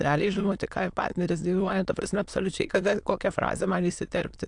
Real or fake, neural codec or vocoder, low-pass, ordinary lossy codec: fake; autoencoder, 22.05 kHz, a latent of 192 numbers a frame, VITS, trained on many speakers; 9.9 kHz; AAC, 64 kbps